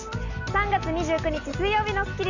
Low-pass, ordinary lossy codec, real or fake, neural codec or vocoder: 7.2 kHz; none; real; none